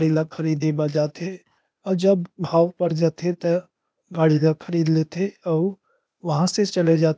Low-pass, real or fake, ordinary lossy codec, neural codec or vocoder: none; fake; none; codec, 16 kHz, 0.8 kbps, ZipCodec